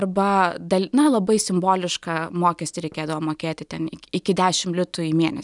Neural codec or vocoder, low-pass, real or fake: none; 10.8 kHz; real